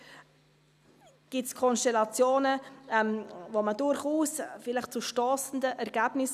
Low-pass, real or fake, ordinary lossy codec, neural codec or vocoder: 14.4 kHz; real; none; none